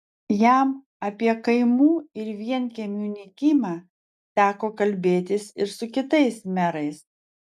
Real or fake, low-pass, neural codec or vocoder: real; 14.4 kHz; none